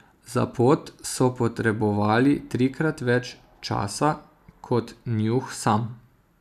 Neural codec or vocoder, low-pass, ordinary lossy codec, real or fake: none; 14.4 kHz; none; real